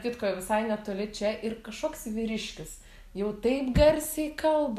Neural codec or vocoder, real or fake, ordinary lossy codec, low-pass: none; real; MP3, 96 kbps; 14.4 kHz